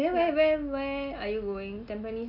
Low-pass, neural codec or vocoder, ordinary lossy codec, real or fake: 5.4 kHz; none; none; real